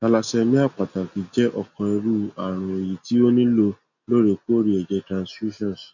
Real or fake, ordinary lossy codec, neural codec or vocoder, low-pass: real; none; none; 7.2 kHz